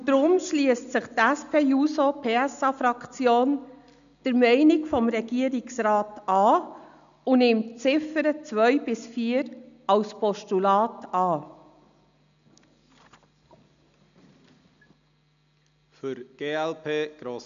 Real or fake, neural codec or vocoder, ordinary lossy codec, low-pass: real; none; none; 7.2 kHz